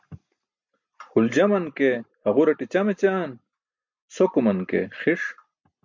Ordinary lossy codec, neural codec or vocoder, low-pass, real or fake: MP3, 64 kbps; none; 7.2 kHz; real